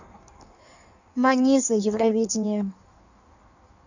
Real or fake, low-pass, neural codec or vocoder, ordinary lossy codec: fake; 7.2 kHz; codec, 16 kHz in and 24 kHz out, 1.1 kbps, FireRedTTS-2 codec; none